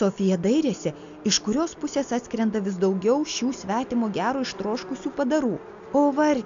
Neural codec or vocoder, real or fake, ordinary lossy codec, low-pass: none; real; MP3, 64 kbps; 7.2 kHz